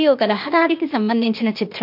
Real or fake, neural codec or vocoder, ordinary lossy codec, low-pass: fake; codec, 16 kHz, 0.8 kbps, ZipCodec; MP3, 48 kbps; 5.4 kHz